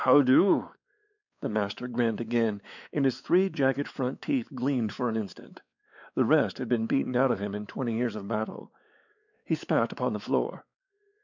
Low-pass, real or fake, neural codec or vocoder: 7.2 kHz; fake; codec, 16 kHz, 4 kbps, X-Codec, WavLM features, trained on Multilingual LibriSpeech